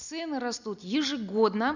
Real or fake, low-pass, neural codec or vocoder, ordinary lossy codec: real; 7.2 kHz; none; none